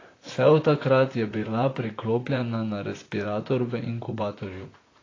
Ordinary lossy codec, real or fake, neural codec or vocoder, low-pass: AAC, 32 kbps; fake; vocoder, 44.1 kHz, 128 mel bands, Pupu-Vocoder; 7.2 kHz